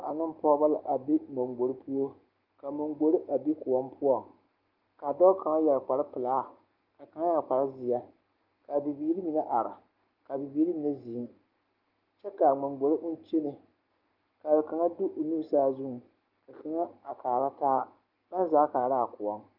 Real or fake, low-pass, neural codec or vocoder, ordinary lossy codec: real; 5.4 kHz; none; Opus, 32 kbps